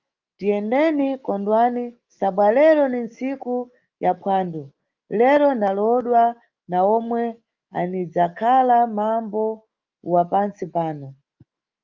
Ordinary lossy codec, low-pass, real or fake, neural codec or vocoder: Opus, 24 kbps; 7.2 kHz; fake; autoencoder, 48 kHz, 128 numbers a frame, DAC-VAE, trained on Japanese speech